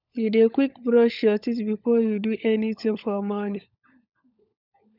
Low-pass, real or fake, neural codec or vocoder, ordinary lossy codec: 5.4 kHz; fake; codec, 16 kHz, 16 kbps, FunCodec, trained on LibriTTS, 50 frames a second; none